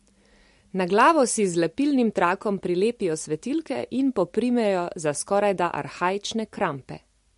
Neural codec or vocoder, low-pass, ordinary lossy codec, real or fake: none; 14.4 kHz; MP3, 48 kbps; real